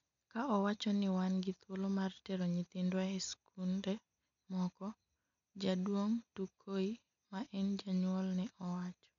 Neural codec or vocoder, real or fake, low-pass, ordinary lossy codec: none; real; 7.2 kHz; MP3, 96 kbps